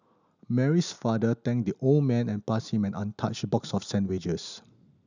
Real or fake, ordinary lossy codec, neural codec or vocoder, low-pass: real; none; none; 7.2 kHz